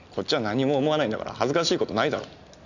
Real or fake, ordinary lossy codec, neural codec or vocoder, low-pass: real; none; none; 7.2 kHz